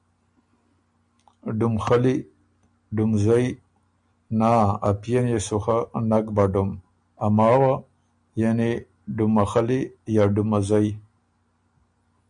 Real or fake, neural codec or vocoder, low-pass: real; none; 9.9 kHz